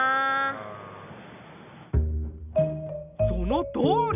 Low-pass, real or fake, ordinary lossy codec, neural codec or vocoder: 3.6 kHz; real; none; none